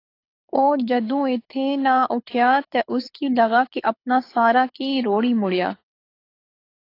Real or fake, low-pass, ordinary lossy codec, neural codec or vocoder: fake; 5.4 kHz; AAC, 32 kbps; codec, 24 kHz, 6 kbps, HILCodec